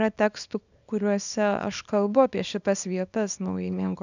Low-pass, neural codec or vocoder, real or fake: 7.2 kHz; codec, 24 kHz, 0.9 kbps, WavTokenizer, small release; fake